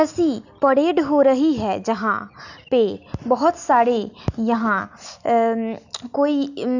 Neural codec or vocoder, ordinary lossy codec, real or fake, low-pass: none; none; real; 7.2 kHz